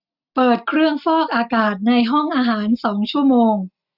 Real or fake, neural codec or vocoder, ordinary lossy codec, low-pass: real; none; none; 5.4 kHz